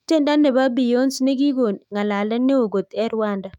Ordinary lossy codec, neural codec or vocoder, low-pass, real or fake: none; autoencoder, 48 kHz, 128 numbers a frame, DAC-VAE, trained on Japanese speech; 19.8 kHz; fake